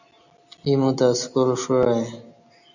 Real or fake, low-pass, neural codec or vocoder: real; 7.2 kHz; none